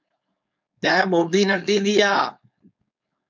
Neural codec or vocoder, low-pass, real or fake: codec, 16 kHz, 4.8 kbps, FACodec; 7.2 kHz; fake